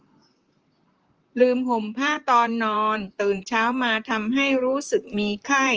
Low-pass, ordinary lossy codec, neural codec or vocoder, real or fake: 7.2 kHz; Opus, 24 kbps; vocoder, 44.1 kHz, 128 mel bands, Pupu-Vocoder; fake